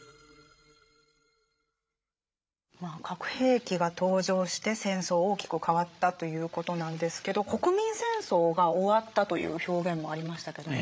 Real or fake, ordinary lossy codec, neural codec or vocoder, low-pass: fake; none; codec, 16 kHz, 8 kbps, FreqCodec, larger model; none